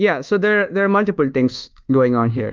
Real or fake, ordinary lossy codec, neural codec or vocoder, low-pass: fake; Opus, 32 kbps; codec, 24 kHz, 1.2 kbps, DualCodec; 7.2 kHz